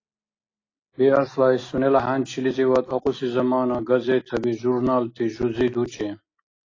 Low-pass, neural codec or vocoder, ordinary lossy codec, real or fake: 7.2 kHz; none; AAC, 32 kbps; real